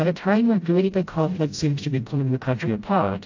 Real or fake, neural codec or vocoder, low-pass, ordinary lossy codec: fake; codec, 16 kHz, 0.5 kbps, FreqCodec, smaller model; 7.2 kHz; AAC, 48 kbps